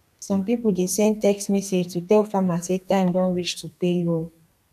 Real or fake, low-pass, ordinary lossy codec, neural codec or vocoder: fake; 14.4 kHz; none; codec, 32 kHz, 1.9 kbps, SNAC